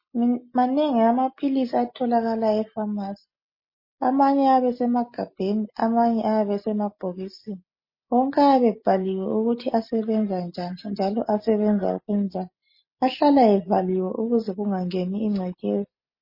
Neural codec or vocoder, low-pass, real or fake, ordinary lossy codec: none; 5.4 kHz; real; MP3, 24 kbps